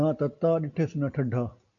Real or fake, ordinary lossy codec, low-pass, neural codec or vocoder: real; MP3, 96 kbps; 7.2 kHz; none